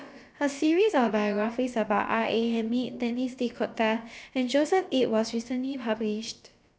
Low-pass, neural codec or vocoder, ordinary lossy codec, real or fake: none; codec, 16 kHz, about 1 kbps, DyCAST, with the encoder's durations; none; fake